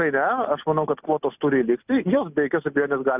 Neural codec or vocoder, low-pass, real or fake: none; 3.6 kHz; real